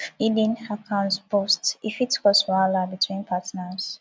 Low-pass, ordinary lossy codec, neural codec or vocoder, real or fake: none; none; none; real